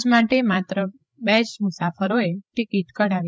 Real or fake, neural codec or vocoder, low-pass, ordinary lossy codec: fake; codec, 16 kHz, 8 kbps, FreqCodec, larger model; none; none